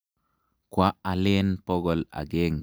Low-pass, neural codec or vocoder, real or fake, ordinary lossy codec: none; none; real; none